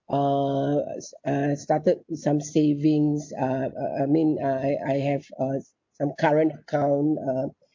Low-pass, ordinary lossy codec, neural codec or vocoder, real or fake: 7.2 kHz; MP3, 64 kbps; none; real